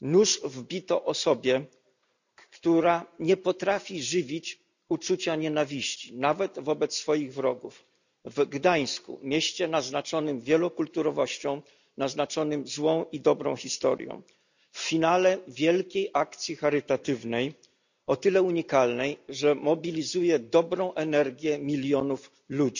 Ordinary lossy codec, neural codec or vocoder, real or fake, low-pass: none; none; real; 7.2 kHz